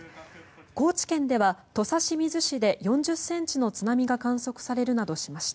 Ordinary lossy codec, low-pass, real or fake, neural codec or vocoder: none; none; real; none